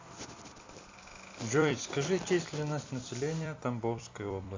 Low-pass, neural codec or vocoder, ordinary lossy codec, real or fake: 7.2 kHz; vocoder, 44.1 kHz, 128 mel bands every 256 samples, BigVGAN v2; MP3, 64 kbps; fake